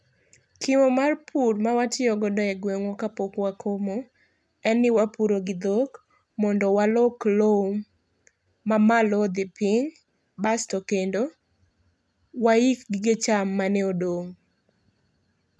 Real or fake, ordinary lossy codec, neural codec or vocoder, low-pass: real; none; none; none